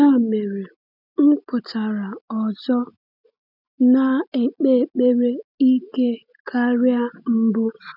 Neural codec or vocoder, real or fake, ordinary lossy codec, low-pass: none; real; none; 5.4 kHz